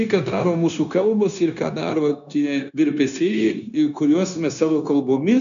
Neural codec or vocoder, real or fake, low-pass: codec, 16 kHz, 0.9 kbps, LongCat-Audio-Codec; fake; 7.2 kHz